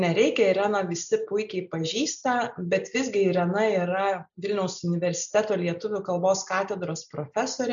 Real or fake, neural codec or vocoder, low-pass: real; none; 7.2 kHz